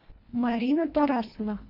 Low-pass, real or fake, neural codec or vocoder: 5.4 kHz; fake; codec, 24 kHz, 1.5 kbps, HILCodec